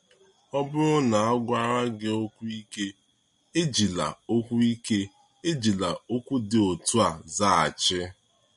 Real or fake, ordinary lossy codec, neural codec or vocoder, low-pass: real; MP3, 48 kbps; none; 19.8 kHz